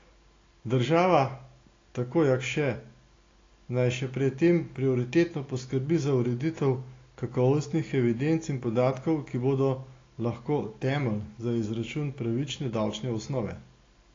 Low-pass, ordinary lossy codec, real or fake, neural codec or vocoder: 7.2 kHz; AAC, 32 kbps; real; none